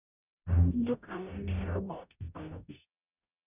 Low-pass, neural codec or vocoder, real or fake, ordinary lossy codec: 3.6 kHz; codec, 44.1 kHz, 0.9 kbps, DAC; fake; none